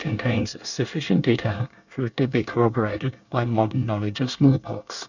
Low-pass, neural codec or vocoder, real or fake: 7.2 kHz; codec, 24 kHz, 1 kbps, SNAC; fake